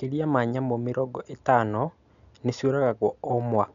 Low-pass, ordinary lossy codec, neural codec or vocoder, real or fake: 7.2 kHz; none; none; real